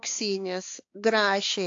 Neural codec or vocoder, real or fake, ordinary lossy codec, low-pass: codec, 16 kHz, 4 kbps, X-Codec, HuBERT features, trained on balanced general audio; fake; AAC, 48 kbps; 7.2 kHz